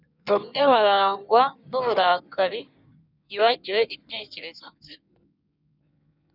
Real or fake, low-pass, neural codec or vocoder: fake; 5.4 kHz; codec, 16 kHz in and 24 kHz out, 1.1 kbps, FireRedTTS-2 codec